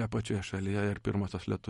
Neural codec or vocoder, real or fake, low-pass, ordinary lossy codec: none; real; 19.8 kHz; MP3, 48 kbps